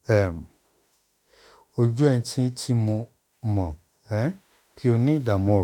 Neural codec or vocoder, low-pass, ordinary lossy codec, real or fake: autoencoder, 48 kHz, 32 numbers a frame, DAC-VAE, trained on Japanese speech; 19.8 kHz; none; fake